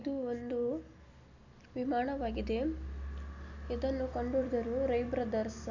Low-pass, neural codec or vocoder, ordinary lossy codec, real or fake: 7.2 kHz; none; none; real